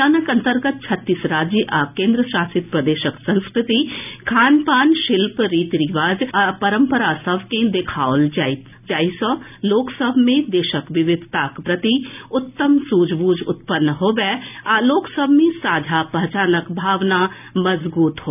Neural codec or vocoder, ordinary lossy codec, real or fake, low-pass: none; none; real; 3.6 kHz